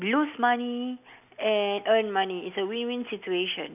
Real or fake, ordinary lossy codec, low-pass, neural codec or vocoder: real; none; 3.6 kHz; none